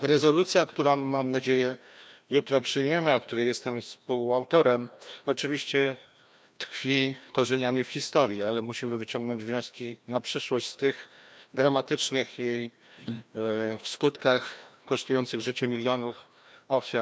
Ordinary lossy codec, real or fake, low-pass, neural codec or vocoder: none; fake; none; codec, 16 kHz, 1 kbps, FreqCodec, larger model